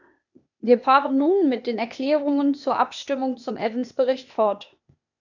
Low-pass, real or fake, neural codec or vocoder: 7.2 kHz; fake; codec, 16 kHz, 0.8 kbps, ZipCodec